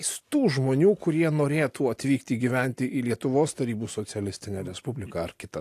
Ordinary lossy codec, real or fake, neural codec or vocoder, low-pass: AAC, 64 kbps; real; none; 14.4 kHz